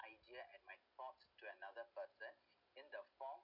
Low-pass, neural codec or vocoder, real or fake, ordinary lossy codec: 5.4 kHz; none; real; none